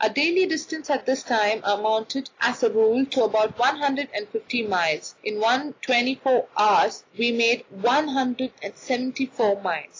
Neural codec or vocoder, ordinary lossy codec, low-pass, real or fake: none; AAC, 32 kbps; 7.2 kHz; real